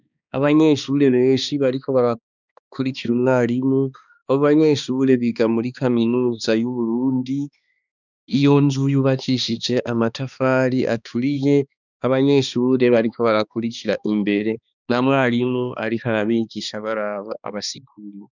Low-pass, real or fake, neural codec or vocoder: 7.2 kHz; fake; codec, 16 kHz, 2 kbps, X-Codec, HuBERT features, trained on balanced general audio